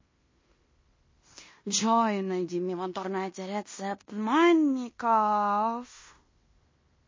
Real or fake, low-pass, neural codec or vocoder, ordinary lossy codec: fake; 7.2 kHz; codec, 16 kHz in and 24 kHz out, 0.9 kbps, LongCat-Audio-Codec, fine tuned four codebook decoder; MP3, 32 kbps